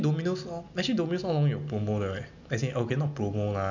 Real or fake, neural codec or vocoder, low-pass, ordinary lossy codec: real; none; 7.2 kHz; none